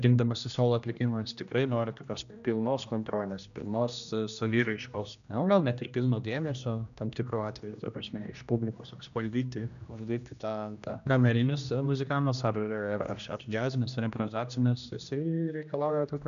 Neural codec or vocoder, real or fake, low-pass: codec, 16 kHz, 1 kbps, X-Codec, HuBERT features, trained on general audio; fake; 7.2 kHz